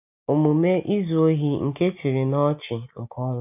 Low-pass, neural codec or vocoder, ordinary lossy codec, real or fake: 3.6 kHz; none; none; real